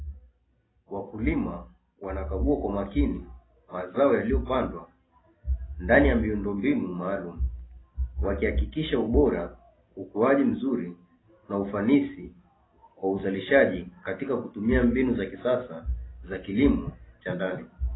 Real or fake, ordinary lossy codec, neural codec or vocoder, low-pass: real; AAC, 16 kbps; none; 7.2 kHz